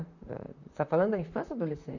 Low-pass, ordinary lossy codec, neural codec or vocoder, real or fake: 7.2 kHz; none; vocoder, 44.1 kHz, 128 mel bands, Pupu-Vocoder; fake